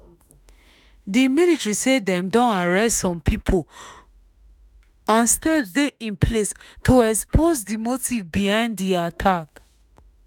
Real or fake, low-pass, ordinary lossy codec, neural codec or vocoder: fake; 19.8 kHz; none; autoencoder, 48 kHz, 32 numbers a frame, DAC-VAE, trained on Japanese speech